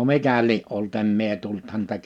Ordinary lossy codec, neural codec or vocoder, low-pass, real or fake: none; none; 19.8 kHz; real